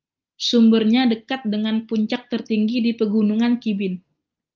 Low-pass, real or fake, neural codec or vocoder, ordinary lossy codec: 7.2 kHz; real; none; Opus, 24 kbps